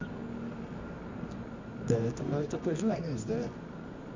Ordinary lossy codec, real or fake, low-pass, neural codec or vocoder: MP3, 64 kbps; fake; 7.2 kHz; codec, 24 kHz, 0.9 kbps, WavTokenizer, medium music audio release